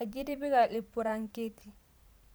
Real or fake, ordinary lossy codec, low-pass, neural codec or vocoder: fake; none; none; vocoder, 44.1 kHz, 128 mel bands every 512 samples, BigVGAN v2